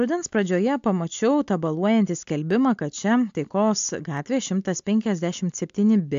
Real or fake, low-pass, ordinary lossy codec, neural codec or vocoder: real; 7.2 kHz; AAC, 64 kbps; none